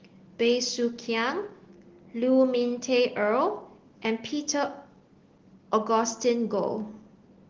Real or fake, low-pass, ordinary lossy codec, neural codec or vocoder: real; 7.2 kHz; Opus, 16 kbps; none